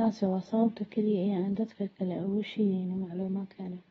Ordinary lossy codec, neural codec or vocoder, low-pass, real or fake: AAC, 24 kbps; codec, 24 kHz, 0.9 kbps, WavTokenizer, medium speech release version 2; 10.8 kHz; fake